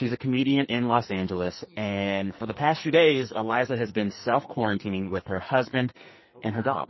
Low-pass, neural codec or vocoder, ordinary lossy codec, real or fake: 7.2 kHz; codec, 44.1 kHz, 2.6 kbps, SNAC; MP3, 24 kbps; fake